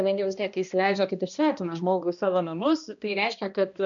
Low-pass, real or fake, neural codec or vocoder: 7.2 kHz; fake; codec, 16 kHz, 1 kbps, X-Codec, HuBERT features, trained on balanced general audio